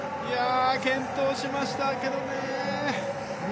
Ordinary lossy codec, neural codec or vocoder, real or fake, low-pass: none; none; real; none